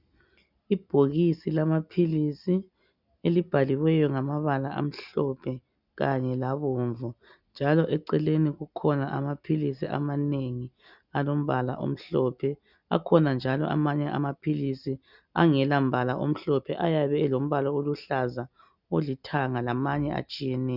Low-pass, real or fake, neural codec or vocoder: 5.4 kHz; real; none